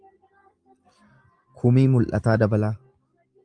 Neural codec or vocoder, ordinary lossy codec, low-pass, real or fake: none; Opus, 32 kbps; 9.9 kHz; real